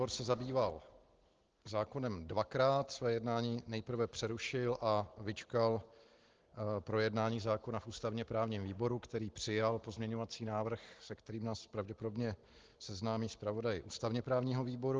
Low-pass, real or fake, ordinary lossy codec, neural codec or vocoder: 7.2 kHz; real; Opus, 16 kbps; none